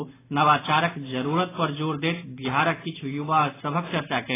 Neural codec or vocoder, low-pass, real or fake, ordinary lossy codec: none; 3.6 kHz; real; AAC, 16 kbps